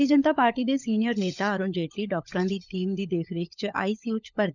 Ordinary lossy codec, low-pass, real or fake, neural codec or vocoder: none; 7.2 kHz; fake; codec, 16 kHz, 16 kbps, FunCodec, trained on LibriTTS, 50 frames a second